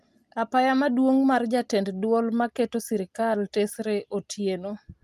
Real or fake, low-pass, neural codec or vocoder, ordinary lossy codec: real; 14.4 kHz; none; Opus, 32 kbps